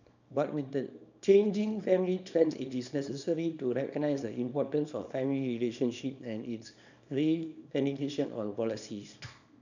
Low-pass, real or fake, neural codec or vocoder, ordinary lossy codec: 7.2 kHz; fake; codec, 24 kHz, 0.9 kbps, WavTokenizer, small release; none